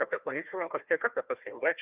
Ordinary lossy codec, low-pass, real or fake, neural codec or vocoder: Opus, 32 kbps; 3.6 kHz; fake; codec, 16 kHz, 1 kbps, FunCodec, trained on Chinese and English, 50 frames a second